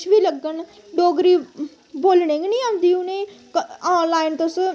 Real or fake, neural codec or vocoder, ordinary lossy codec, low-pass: real; none; none; none